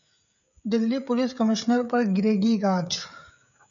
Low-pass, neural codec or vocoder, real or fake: 7.2 kHz; codec, 16 kHz, 16 kbps, FreqCodec, smaller model; fake